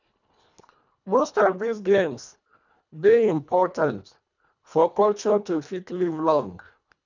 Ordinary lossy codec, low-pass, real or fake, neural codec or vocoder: none; 7.2 kHz; fake; codec, 24 kHz, 1.5 kbps, HILCodec